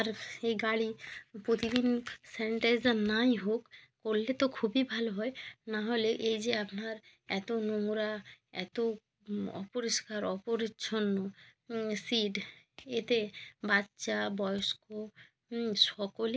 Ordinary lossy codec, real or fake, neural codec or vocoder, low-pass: none; real; none; none